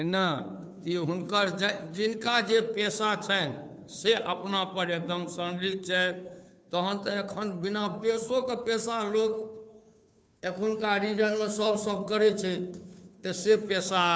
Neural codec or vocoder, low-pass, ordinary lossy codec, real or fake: codec, 16 kHz, 2 kbps, FunCodec, trained on Chinese and English, 25 frames a second; none; none; fake